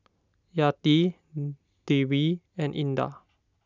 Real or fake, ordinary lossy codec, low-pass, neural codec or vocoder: real; none; 7.2 kHz; none